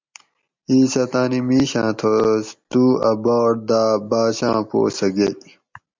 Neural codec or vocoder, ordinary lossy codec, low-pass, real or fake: none; MP3, 64 kbps; 7.2 kHz; real